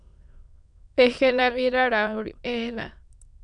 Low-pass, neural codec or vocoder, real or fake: 9.9 kHz; autoencoder, 22.05 kHz, a latent of 192 numbers a frame, VITS, trained on many speakers; fake